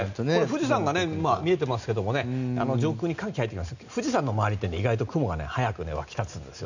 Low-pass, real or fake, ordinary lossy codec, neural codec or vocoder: 7.2 kHz; real; none; none